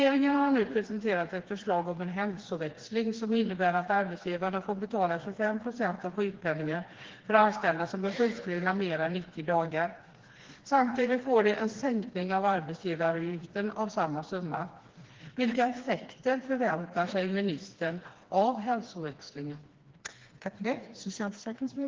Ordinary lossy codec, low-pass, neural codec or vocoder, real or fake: Opus, 16 kbps; 7.2 kHz; codec, 16 kHz, 2 kbps, FreqCodec, smaller model; fake